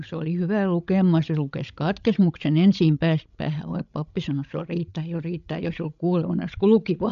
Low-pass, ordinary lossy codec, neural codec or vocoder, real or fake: 7.2 kHz; MP3, 64 kbps; codec, 16 kHz, 16 kbps, FreqCodec, larger model; fake